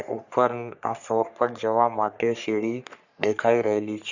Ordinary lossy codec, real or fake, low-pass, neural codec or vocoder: none; fake; 7.2 kHz; codec, 44.1 kHz, 3.4 kbps, Pupu-Codec